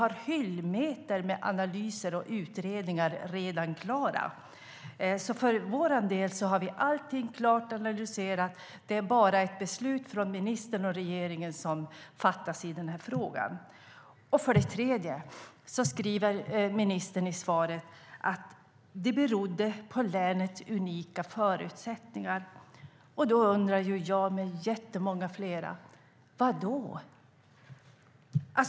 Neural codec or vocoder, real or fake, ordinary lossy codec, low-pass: none; real; none; none